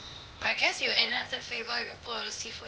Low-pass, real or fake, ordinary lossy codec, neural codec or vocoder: none; fake; none; codec, 16 kHz, 0.8 kbps, ZipCodec